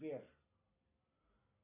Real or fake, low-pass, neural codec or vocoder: real; 3.6 kHz; none